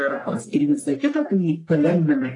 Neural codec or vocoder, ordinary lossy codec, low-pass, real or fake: codec, 44.1 kHz, 1.7 kbps, Pupu-Codec; AAC, 48 kbps; 10.8 kHz; fake